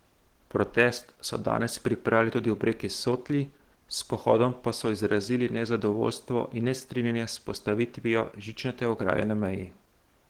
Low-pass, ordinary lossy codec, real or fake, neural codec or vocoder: 19.8 kHz; Opus, 16 kbps; fake; codec, 44.1 kHz, 7.8 kbps, DAC